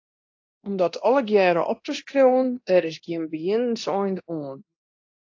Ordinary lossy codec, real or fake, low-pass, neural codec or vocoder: AAC, 48 kbps; fake; 7.2 kHz; codec, 24 kHz, 0.9 kbps, DualCodec